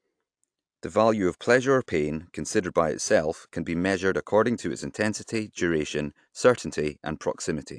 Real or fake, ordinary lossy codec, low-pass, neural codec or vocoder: real; AAC, 64 kbps; 9.9 kHz; none